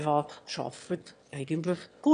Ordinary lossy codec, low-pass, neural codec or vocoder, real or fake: none; 9.9 kHz; autoencoder, 22.05 kHz, a latent of 192 numbers a frame, VITS, trained on one speaker; fake